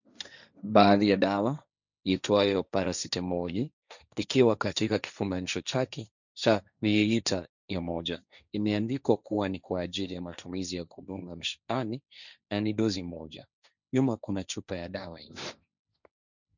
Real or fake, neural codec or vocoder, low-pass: fake; codec, 16 kHz, 1.1 kbps, Voila-Tokenizer; 7.2 kHz